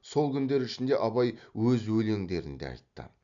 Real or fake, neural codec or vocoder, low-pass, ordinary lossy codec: real; none; 7.2 kHz; none